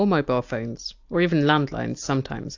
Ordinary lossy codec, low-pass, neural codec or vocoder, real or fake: AAC, 48 kbps; 7.2 kHz; none; real